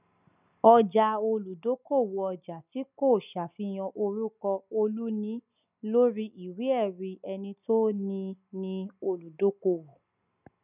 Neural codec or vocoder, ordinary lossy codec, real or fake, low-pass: none; none; real; 3.6 kHz